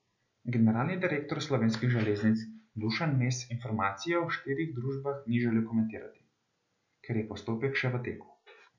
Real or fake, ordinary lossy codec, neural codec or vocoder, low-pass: real; none; none; 7.2 kHz